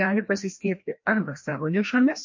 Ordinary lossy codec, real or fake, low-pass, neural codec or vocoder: MP3, 48 kbps; fake; 7.2 kHz; codec, 16 kHz, 1 kbps, FreqCodec, larger model